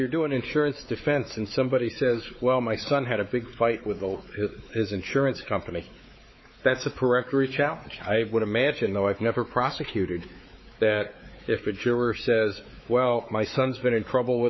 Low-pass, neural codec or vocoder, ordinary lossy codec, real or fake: 7.2 kHz; codec, 16 kHz, 4 kbps, X-Codec, WavLM features, trained on Multilingual LibriSpeech; MP3, 24 kbps; fake